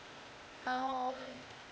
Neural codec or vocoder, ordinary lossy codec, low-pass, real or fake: codec, 16 kHz, 0.8 kbps, ZipCodec; none; none; fake